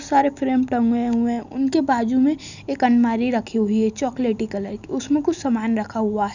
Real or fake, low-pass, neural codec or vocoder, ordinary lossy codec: real; 7.2 kHz; none; none